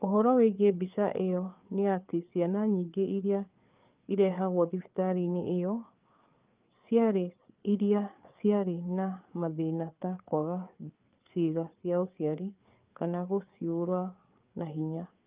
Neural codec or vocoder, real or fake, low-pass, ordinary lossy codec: codec, 16 kHz, 4 kbps, FreqCodec, larger model; fake; 3.6 kHz; Opus, 24 kbps